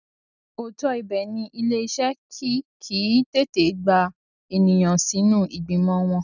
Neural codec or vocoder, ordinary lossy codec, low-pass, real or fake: none; none; 7.2 kHz; real